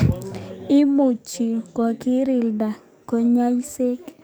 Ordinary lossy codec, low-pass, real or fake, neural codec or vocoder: none; none; fake; codec, 44.1 kHz, 7.8 kbps, DAC